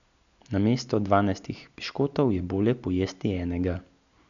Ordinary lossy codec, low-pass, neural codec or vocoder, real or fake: none; 7.2 kHz; none; real